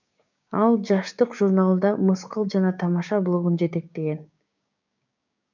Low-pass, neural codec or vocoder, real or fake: 7.2 kHz; codec, 16 kHz, 6 kbps, DAC; fake